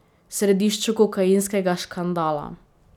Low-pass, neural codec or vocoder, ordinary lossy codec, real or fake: 19.8 kHz; none; none; real